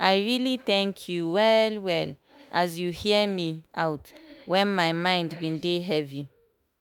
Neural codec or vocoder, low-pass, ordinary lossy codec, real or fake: autoencoder, 48 kHz, 32 numbers a frame, DAC-VAE, trained on Japanese speech; none; none; fake